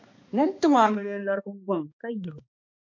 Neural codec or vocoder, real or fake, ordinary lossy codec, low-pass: codec, 16 kHz, 2 kbps, X-Codec, HuBERT features, trained on balanced general audio; fake; MP3, 48 kbps; 7.2 kHz